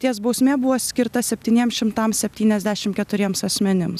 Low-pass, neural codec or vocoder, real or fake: 14.4 kHz; none; real